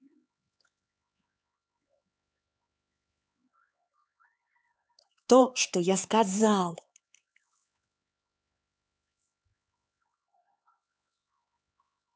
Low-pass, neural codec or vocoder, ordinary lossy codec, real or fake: none; codec, 16 kHz, 4 kbps, X-Codec, HuBERT features, trained on LibriSpeech; none; fake